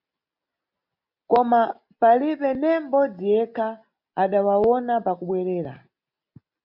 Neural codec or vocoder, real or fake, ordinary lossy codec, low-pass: none; real; MP3, 48 kbps; 5.4 kHz